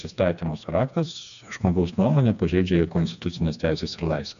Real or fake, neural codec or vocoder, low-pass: fake; codec, 16 kHz, 2 kbps, FreqCodec, smaller model; 7.2 kHz